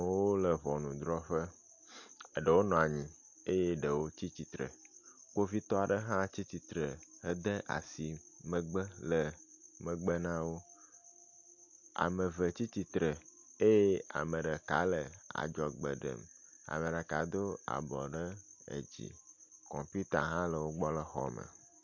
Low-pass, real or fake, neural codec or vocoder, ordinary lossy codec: 7.2 kHz; real; none; MP3, 48 kbps